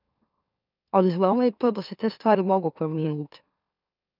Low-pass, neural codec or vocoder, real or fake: 5.4 kHz; autoencoder, 44.1 kHz, a latent of 192 numbers a frame, MeloTTS; fake